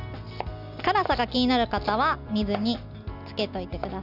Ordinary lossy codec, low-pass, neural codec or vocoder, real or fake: none; 5.4 kHz; none; real